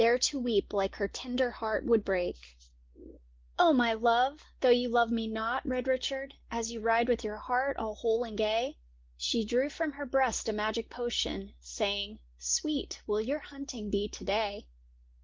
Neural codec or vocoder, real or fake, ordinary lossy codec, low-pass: none; real; Opus, 16 kbps; 7.2 kHz